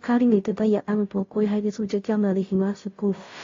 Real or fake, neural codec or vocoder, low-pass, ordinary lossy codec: fake; codec, 16 kHz, 0.5 kbps, FunCodec, trained on Chinese and English, 25 frames a second; 7.2 kHz; AAC, 32 kbps